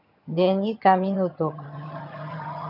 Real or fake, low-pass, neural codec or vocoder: fake; 5.4 kHz; vocoder, 22.05 kHz, 80 mel bands, HiFi-GAN